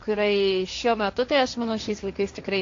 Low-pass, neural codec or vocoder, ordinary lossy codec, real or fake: 7.2 kHz; codec, 16 kHz, 1.1 kbps, Voila-Tokenizer; AAC, 48 kbps; fake